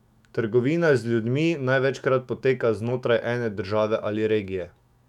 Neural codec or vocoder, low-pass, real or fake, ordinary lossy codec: autoencoder, 48 kHz, 128 numbers a frame, DAC-VAE, trained on Japanese speech; 19.8 kHz; fake; none